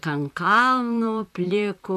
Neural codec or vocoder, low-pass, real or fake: vocoder, 44.1 kHz, 128 mel bands, Pupu-Vocoder; 14.4 kHz; fake